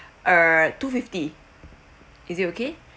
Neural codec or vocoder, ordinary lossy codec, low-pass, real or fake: none; none; none; real